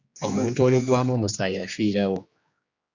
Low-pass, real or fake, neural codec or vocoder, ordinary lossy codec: 7.2 kHz; fake; codec, 16 kHz, 2 kbps, X-Codec, HuBERT features, trained on general audio; Opus, 64 kbps